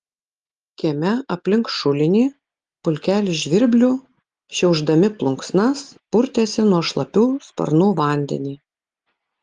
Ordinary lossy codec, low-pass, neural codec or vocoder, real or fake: Opus, 24 kbps; 9.9 kHz; none; real